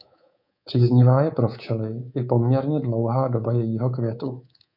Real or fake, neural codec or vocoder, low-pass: fake; codec, 24 kHz, 3.1 kbps, DualCodec; 5.4 kHz